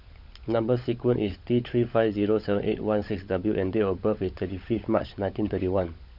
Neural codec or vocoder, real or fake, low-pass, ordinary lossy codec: codec, 16 kHz, 16 kbps, FunCodec, trained on LibriTTS, 50 frames a second; fake; 5.4 kHz; none